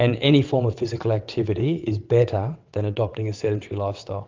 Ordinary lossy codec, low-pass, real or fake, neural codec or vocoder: Opus, 32 kbps; 7.2 kHz; fake; vocoder, 22.05 kHz, 80 mel bands, WaveNeXt